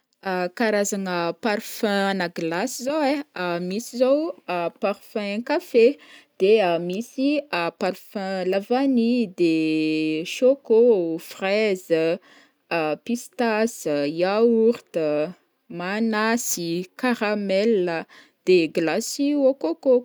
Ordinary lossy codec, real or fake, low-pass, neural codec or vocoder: none; real; none; none